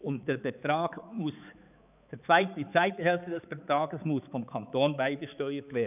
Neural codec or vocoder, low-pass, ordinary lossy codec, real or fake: codec, 16 kHz, 4 kbps, X-Codec, HuBERT features, trained on balanced general audio; 3.6 kHz; none; fake